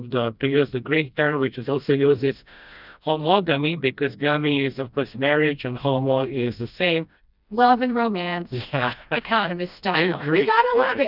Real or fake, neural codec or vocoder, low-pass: fake; codec, 16 kHz, 1 kbps, FreqCodec, smaller model; 5.4 kHz